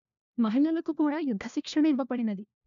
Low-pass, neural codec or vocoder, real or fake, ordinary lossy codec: 7.2 kHz; codec, 16 kHz, 1 kbps, FunCodec, trained on LibriTTS, 50 frames a second; fake; AAC, 96 kbps